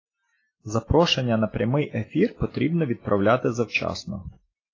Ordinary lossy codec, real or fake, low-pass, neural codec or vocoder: AAC, 32 kbps; real; 7.2 kHz; none